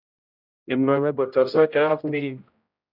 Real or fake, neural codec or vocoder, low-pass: fake; codec, 16 kHz, 0.5 kbps, X-Codec, HuBERT features, trained on general audio; 5.4 kHz